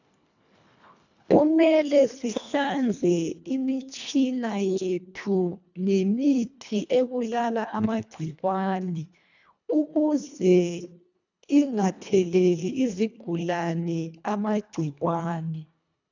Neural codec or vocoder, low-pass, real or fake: codec, 24 kHz, 1.5 kbps, HILCodec; 7.2 kHz; fake